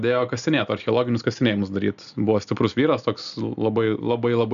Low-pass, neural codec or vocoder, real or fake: 7.2 kHz; none; real